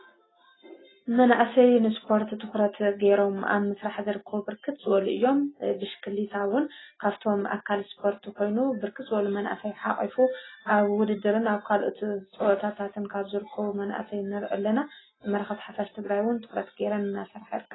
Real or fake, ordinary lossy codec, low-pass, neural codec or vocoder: real; AAC, 16 kbps; 7.2 kHz; none